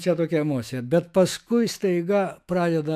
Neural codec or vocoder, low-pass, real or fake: autoencoder, 48 kHz, 128 numbers a frame, DAC-VAE, trained on Japanese speech; 14.4 kHz; fake